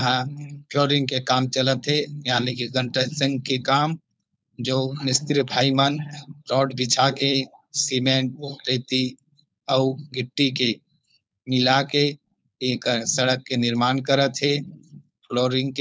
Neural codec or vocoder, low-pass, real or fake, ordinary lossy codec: codec, 16 kHz, 4.8 kbps, FACodec; none; fake; none